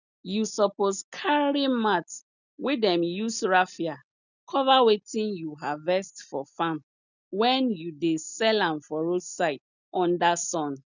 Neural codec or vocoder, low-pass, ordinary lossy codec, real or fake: none; 7.2 kHz; none; real